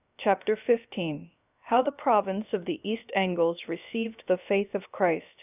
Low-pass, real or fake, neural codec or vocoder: 3.6 kHz; fake; codec, 16 kHz, about 1 kbps, DyCAST, with the encoder's durations